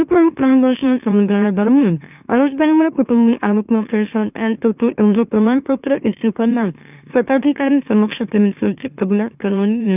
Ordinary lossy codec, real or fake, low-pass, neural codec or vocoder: none; fake; 3.6 kHz; autoencoder, 44.1 kHz, a latent of 192 numbers a frame, MeloTTS